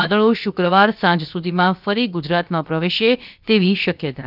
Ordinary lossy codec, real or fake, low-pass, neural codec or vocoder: none; fake; 5.4 kHz; codec, 16 kHz, about 1 kbps, DyCAST, with the encoder's durations